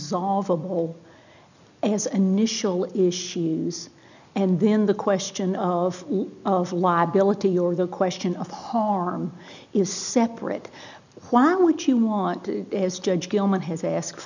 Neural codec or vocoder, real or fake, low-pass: none; real; 7.2 kHz